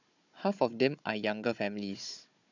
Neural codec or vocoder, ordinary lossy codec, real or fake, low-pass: codec, 16 kHz, 16 kbps, FunCodec, trained on Chinese and English, 50 frames a second; none; fake; 7.2 kHz